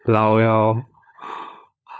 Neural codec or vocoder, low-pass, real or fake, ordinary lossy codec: codec, 16 kHz, 16 kbps, FunCodec, trained on LibriTTS, 50 frames a second; none; fake; none